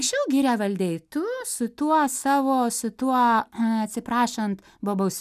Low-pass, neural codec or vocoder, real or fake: 14.4 kHz; none; real